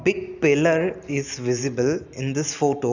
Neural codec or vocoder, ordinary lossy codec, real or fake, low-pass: none; none; real; 7.2 kHz